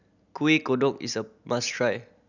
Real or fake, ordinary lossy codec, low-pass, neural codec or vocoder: real; none; 7.2 kHz; none